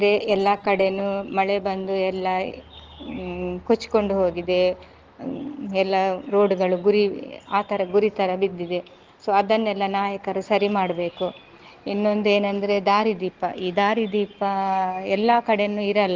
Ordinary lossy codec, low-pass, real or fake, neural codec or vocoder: Opus, 16 kbps; 7.2 kHz; real; none